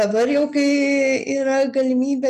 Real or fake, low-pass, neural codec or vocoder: real; 14.4 kHz; none